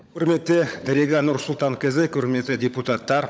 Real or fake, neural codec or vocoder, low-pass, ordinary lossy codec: fake; codec, 16 kHz, 16 kbps, FunCodec, trained on LibriTTS, 50 frames a second; none; none